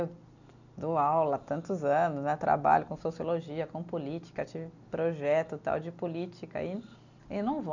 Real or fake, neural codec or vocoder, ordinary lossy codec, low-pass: real; none; none; 7.2 kHz